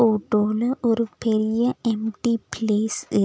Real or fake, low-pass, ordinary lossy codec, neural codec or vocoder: real; none; none; none